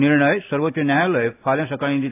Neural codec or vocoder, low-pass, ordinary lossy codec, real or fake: none; 3.6 kHz; AAC, 16 kbps; real